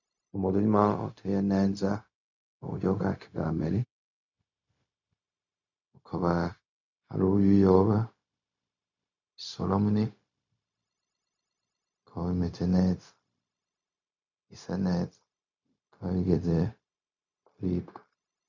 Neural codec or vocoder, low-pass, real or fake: codec, 16 kHz, 0.4 kbps, LongCat-Audio-Codec; 7.2 kHz; fake